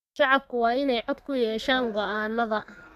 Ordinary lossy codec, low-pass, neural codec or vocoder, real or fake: none; 14.4 kHz; codec, 32 kHz, 1.9 kbps, SNAC; fake